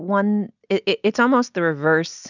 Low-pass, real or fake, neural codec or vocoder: 7.2 kHz; real; none